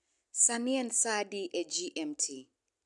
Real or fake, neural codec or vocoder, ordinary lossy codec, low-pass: real; none; none; 10.8 kHz